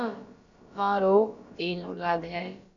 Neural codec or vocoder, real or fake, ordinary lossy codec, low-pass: codec, 16 kHz, about 1 kbps, DyCAST, with the encoder's durations; fake; MP3, 64 kbps; 7.2 kHz